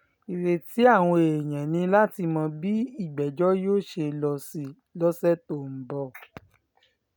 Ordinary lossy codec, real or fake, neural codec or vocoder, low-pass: none; real; none; none